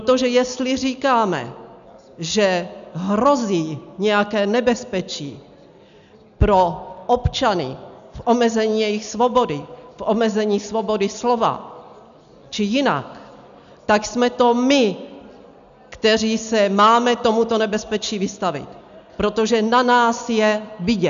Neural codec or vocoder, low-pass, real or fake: none; 7.2 kHz; real